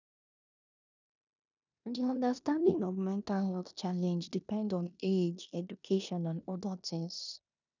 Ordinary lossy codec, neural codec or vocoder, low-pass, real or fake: none; codec, 16 kHz in and 24 kHz out, 0.9 kbps, LongCat-Audio-Codec, four codebook decoder; 7.2 kHz; fake